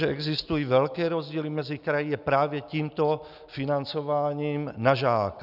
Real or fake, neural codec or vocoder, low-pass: real; none; 5.4 kHz